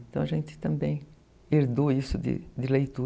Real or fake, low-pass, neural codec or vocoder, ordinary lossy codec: real; none; none; none